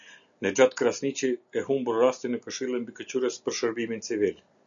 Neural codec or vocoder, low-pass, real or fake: none; 7.2 kHz; real